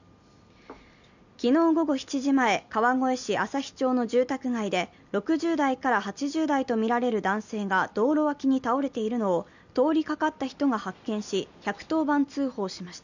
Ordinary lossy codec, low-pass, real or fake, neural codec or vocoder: none; 7.2 kHz; real; none